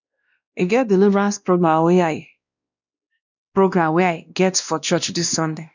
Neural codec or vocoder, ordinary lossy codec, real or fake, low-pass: codec, 16 kHz, 1 kbps, X-Codec, WavLM features, trained on Multilingual LibriSpeech; none; fake; 7.2 kHz